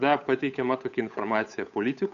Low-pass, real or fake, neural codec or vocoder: 7.2 kHz; fake; codec, 16 kHz, 8 kbps, FunCodec, trained on Chinese and English, 25 frames a second